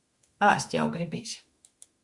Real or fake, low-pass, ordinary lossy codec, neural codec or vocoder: fake; 10.8 kHz; Opus, 64 kbps; autoencoder, 48 kHz, 32 numbers a frame, DAC-VAE, trained on Japanese speech